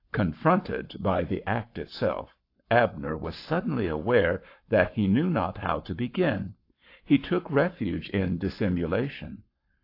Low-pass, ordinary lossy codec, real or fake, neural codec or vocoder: 5.4 kHz; AAC, 32 kbps; fake; codec, 44.1 kHz, 7.8 kbps, Pupu-Codec